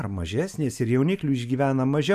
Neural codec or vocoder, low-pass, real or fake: none; 14.4 kHz; real